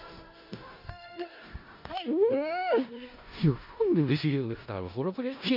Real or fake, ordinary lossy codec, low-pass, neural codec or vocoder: fake; MP3, 48 kbps; 5.4 kHz; codec, 16 kHz in and 24 kHz out, 0.4 kbps, LongCat-Audio-Codec, four codebook decoder